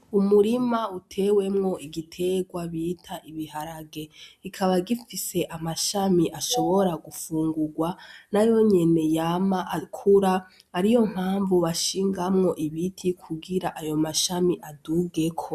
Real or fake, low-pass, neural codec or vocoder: real; 14.4 kHz; none